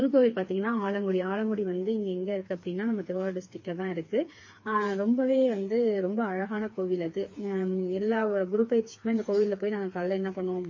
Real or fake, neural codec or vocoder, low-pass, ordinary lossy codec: fake; codec, 16 kHz, 4 kbps, FreqCodec, smaller model; 7.2 kHz; MP3, 32 kbps